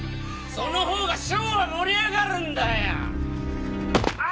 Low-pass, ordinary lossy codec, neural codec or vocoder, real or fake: none; none; none; real